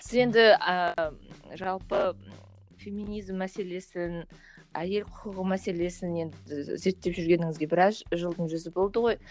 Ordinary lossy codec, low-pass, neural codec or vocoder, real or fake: none; none; none; real